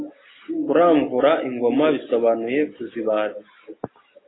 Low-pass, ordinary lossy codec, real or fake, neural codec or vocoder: 7.2 kHz; AAC, 16 kbps; real; none